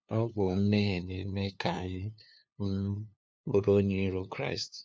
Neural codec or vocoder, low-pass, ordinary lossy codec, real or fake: codec, 16 kHz, 2 kbps, FunCodec, trained on LibriTTS, 25 frames a second; none; none; fake